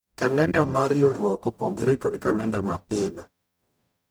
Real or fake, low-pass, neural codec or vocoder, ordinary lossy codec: fake; none; codec, 44.1 kHz, 0.9 kbps, DAC; none